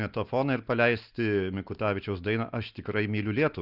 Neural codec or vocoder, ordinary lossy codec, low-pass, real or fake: none; Opus, 24 kbps; 5.4 kHz; real